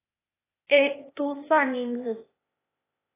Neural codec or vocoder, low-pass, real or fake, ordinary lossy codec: codec, 16 kHz, 0.8 kbps, ZipCodec; 3.6 kHz; fake; AAC, 24 kbps